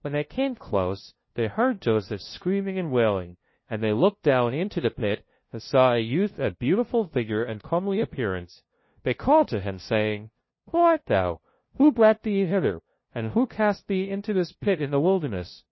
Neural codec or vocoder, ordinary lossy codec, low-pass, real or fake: codec, 16 kHz, 0.5 kbps, FunCodec, trained on LibriTTS, 25 frames a second; MP3, 24 kbps; 7.2 kHz; fake